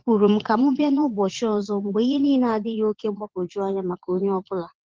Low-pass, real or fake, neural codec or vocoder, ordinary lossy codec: 7.2 kHz; fake; vocoder, 22.05 kHz, 80 mel bands, Vocos; Opus, 16 kbps